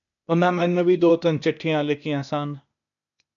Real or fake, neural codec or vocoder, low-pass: fake; codec, 16 kHz, 0.8 kbps, ZipCodec; 7.2 kHz